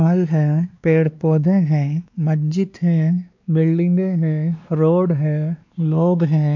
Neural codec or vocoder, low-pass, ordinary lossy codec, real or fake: codec, 16 kHz, 2 kbps, X-Codec, WavLM features, trained on Multilingual LibriSpeech; 7.2 kHz; AAC, 48 kbps; fake